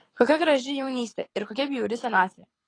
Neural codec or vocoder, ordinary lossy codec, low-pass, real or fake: codec, 24 kHz, 6 kbps, HILCodec; AAC, 32 kbps; 9.9 kHz; fake